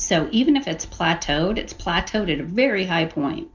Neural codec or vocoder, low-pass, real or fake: none; 7.2 kHz; real